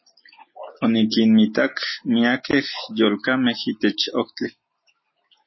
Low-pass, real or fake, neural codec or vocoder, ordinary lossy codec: 7.2 kHz; real; none; MP3, 24 kbps